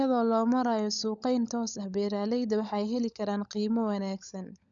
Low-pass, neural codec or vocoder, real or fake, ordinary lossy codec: 7.2 kHz; codec, 16 kHz, 16 kbps, FunCodec, trained on LibriTTS, 50 frames a second; fake; none